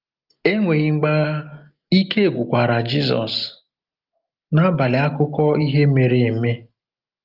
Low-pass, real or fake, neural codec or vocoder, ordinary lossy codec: 5.4 kHz; real; none; Opus, 32 kbps